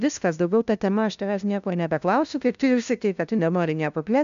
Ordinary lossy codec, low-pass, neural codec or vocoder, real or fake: MP3, 96 kbps; 7.2 kHz; codec, 16 kHz, 0.5 kbps, FunCodec, trained on LibriTTS, 25 frames a second; fake